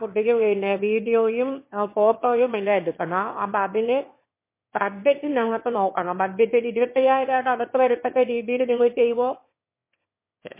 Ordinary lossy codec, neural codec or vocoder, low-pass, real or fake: MP3, 24 kbps; autoencoder, 22.05 kHz, a latent of 192 numbers a frame, VITS, trained on one speaker; 3.6 kHz; fake